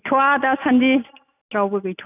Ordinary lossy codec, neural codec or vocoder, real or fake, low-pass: none; none; real; 3.6 kHz